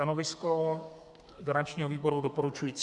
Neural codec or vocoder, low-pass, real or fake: codec, 32 kHz, 1.9 kbps, SNAC; 10.8 kHz; fake